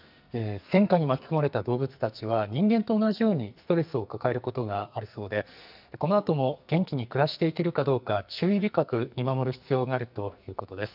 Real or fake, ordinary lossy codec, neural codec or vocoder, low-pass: fake; none; codec, 44.1 kHz, 2.6 kbps, SNAC; 5.4 kHz